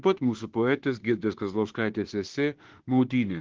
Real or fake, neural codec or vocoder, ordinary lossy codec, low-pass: fake; autoencoder, 48 kHz, 32 numbers a frame, DAC-VAE, trained on Japanese speech; Opus, 16 kbps; 7.2 kHz